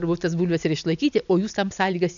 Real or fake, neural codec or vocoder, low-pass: real; none; 7.2 kHz